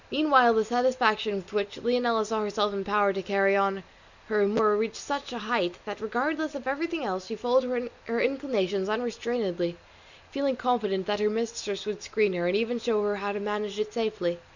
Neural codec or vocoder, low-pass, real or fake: none; 7.2 kHz; real